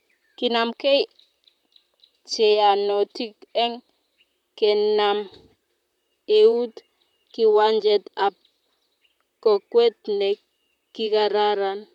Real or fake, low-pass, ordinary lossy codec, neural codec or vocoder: fake; 19.8 kHz; none; vocoder, 44.1 kHz, 128 mel bands, Pupu-Vocoder